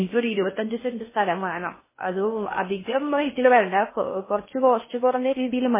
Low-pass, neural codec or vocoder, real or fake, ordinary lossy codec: 3.6 kHz; codec, 16 kHz in and 24 kHz out, 0.6 kbps, FocalCodec, streaming, 4096 codes; fake; MP3, 16 kbps